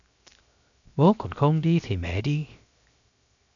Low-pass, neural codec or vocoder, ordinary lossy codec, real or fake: 7.2 kHz; codec, 16 kHz, 0.7 kbps, FocalCodec; MP3, 96 kbps; fake